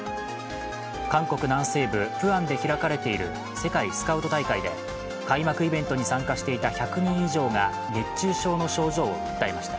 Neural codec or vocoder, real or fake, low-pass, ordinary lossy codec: none; real; none; none